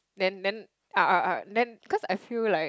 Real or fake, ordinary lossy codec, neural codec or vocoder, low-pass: real; none; none; none